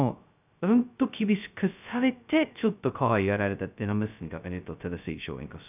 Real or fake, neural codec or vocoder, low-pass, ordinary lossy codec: fake; codec, 16 kHz, 0.2 kbps, FocalCodec; 3.6 kHz; none